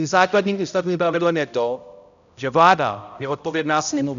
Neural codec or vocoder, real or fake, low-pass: codec, 16 kHz, 0.5 kbps, X-Codec, HuBERT features, trained on balanced general audio; fake; 7.2 kHz